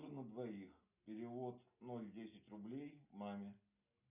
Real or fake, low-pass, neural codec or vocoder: real; 3.6 kHz; none